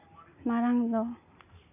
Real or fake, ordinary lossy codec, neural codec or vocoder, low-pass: real; none; none; 3.6 kHz